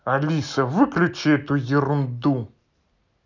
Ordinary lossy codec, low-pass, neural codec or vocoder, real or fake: none; 7.2 kHz; none; real